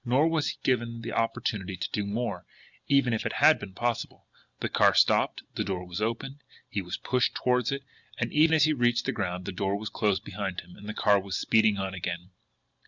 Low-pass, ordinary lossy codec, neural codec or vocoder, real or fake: 7.2 kHz; Opus, 64 kbps; none; real